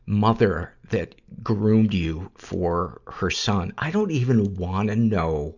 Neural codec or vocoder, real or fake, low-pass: none; real; 7.2 kHz